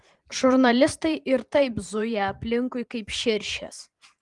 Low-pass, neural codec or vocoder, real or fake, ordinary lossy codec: 10.8 kHz; vocoder, 44.1 kHz, 128 mel bands every 512 samples, BigVGAN v2; fake; Opus, 24 kbps